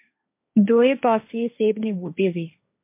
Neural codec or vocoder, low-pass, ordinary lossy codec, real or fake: codec, 16 kHz, 1.1 kbps, Voila-Tokenizer; 3.6 kHz; MP3, 24 kbps; fake